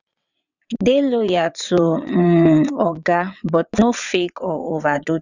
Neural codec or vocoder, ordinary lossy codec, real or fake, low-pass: vocoder, 22.05 kHz, 80 mel bands, WaveNeXt; none; fake; 7.2 kHz